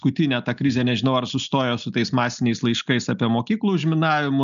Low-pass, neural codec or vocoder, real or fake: 7.2 kHz; none; real